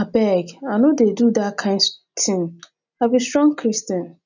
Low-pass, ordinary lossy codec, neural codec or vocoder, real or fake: 7.2 kHz; none; none; real